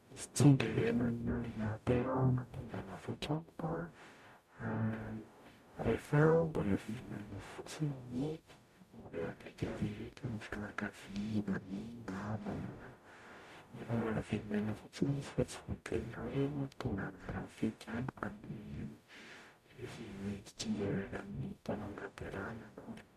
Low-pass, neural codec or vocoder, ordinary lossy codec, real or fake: 14.4 kHz; codec, 44.1 kHz, 0.9 kbps, DAC; none; fake